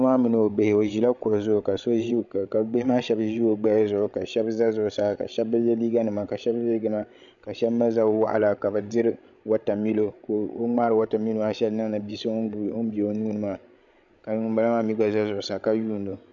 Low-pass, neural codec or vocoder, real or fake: 7.2 kHz; codec, 16 kHz, 16 kbps, FreqCodec, larger model; fake